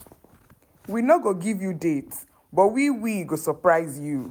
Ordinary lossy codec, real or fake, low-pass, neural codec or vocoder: none; real; none; none